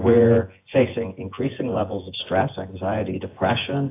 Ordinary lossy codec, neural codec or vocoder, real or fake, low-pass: AAC, 24 kbps; vocoder, 24 kHz, 100 mel bands, Vocos; fake; 3.6 kHz